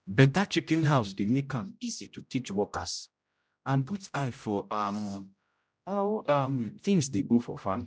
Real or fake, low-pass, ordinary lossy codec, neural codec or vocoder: fake; none; none; codec, 16 kHz, 0.5 kbps, X-Codec, HuBERT features, trained on general audio